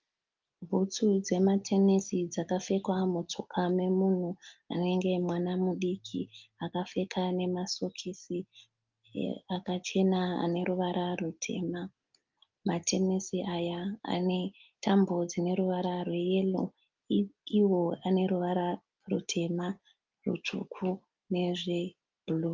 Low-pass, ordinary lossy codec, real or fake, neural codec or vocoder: 7.2 kHz; Opus, 32 kbps; real; none